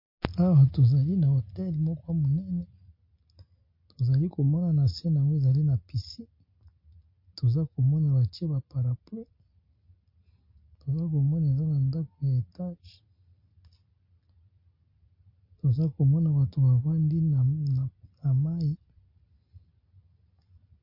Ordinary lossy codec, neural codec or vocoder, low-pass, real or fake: MP3, 32 kbps; none; 5.4 kHz; real